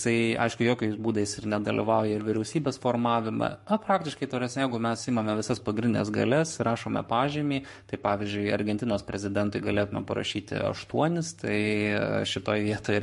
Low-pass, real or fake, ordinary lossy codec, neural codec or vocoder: 14.4 kHz; fake; MP3, 48 kbps; codec, 44.1 kHz, 7.8 kbps, Pupu-Codec